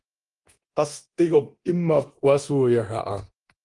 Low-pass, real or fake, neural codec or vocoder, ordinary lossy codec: 10.8 kHz; fake; codec, 24 kHz, 0.9 kbps, DualCodec; Opus, 24 kbps